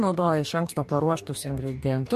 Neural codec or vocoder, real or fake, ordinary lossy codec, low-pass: codec, 44.1 kHz, 2.6 kbps, DAC; fake; MP3, 64 kbps; 14.4 kHz